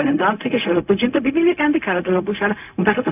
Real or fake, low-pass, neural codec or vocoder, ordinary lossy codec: fake; 3.6 kHz; codec, 16 kHz, 0.4 kbps, LongCat-Audio-Codec; none